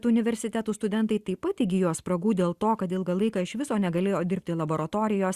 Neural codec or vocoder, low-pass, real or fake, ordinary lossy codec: none; 14.4 kHz; real; Opus, 64 kbps